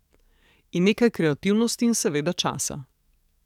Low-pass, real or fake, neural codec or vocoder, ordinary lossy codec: 19.8 kHz; fake; codec, 44.1 kHz, 7.8 kbps, DAC; none